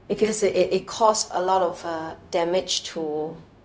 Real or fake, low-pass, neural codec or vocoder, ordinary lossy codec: fake; none; codec, 16 kHz, 0.4 kbps, LongCat-Audio-Codec; none